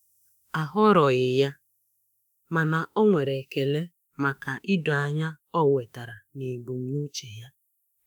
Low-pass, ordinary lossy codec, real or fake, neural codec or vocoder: none; none; fake; autoencoder, 48 kHz, 32 numbers a frame, DAC-VAE, trained on Japanese speech